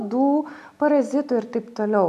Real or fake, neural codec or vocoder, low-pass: real; none; 14.4 kHz